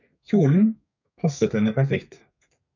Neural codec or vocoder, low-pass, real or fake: codec, 32 kHz, 1.9 kbps, SNAC; 7.2 kHz; fake